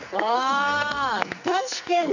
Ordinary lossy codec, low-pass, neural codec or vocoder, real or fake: none; 7.2 kHz; codec, 44.1 kHz, 2.6 kbps, SNAC; fake